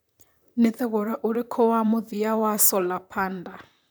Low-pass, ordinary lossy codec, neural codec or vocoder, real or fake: none; none; vocoder, 44.1 kHz, 128 mel bands, Pupu-Vocoder; fake